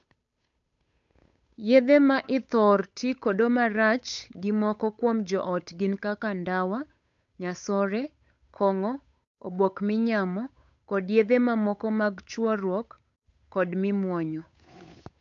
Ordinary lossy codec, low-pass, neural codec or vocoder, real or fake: MP3, 64 kbps; 7.2 kHz; codec, 16 kHz, 8 kbps, FunCodec, trained on Chinese and English, 25 frames a second; fake